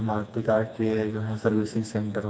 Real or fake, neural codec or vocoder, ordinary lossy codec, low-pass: fake; codec, 16 kHz, 2 kbps, FreqCodec, smaller model; none; none